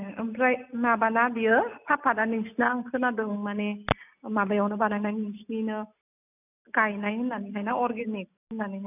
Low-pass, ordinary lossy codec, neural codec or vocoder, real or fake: 3.6 kHz; none; none; real